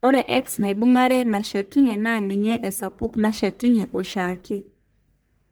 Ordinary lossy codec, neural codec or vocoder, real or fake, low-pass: none; codec, 44.1 kHz, 1.7 kbps, Pupu-Codec; fake; none